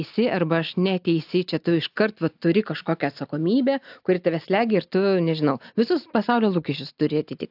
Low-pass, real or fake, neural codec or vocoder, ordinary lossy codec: 5.4 kHz; real; none; AAC, 48 kbps